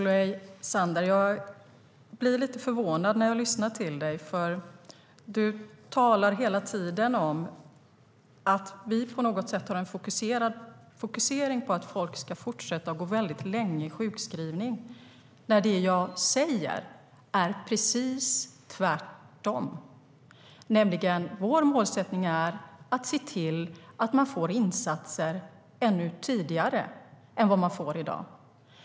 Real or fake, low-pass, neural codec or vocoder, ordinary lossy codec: real; none; none; none